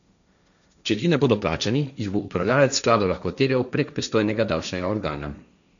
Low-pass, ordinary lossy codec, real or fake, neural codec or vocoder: 7.2 kHz; none; fake; codec, 16 kHz, 1.1 kbps, Voila-Tokenizer